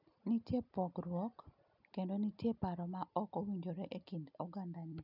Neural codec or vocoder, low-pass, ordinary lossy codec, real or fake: none; 5.4 kHz; none; real